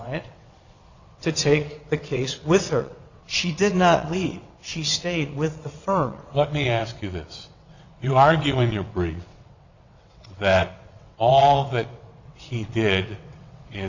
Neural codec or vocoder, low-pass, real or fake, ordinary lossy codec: vocoder, 22.05 kHz, 80 mel bands, WaveNeXt; 7.2 kHz; fake; Opus, 64 kbps